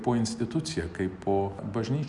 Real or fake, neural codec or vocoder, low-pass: real; none; 10.8 kHz